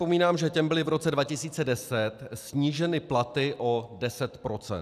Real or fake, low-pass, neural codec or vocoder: real; 14.4 kHz; none